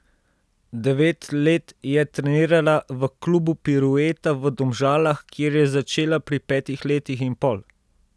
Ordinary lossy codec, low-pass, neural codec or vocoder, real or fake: none; none; none; real